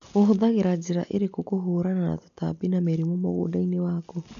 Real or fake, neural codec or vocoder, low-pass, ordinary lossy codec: real; none; 7.2 kHz; none